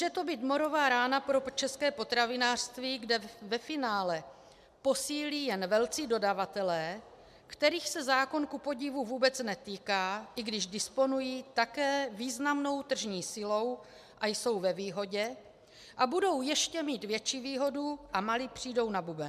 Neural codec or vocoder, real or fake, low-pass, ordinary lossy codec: none; real; 14.4 kHz; MP3, 96 kbps